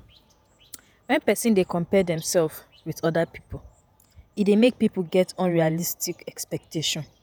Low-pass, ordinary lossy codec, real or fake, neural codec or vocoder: none; none; fake; vocoder, 48 kHz, 128 mel bands, Vocos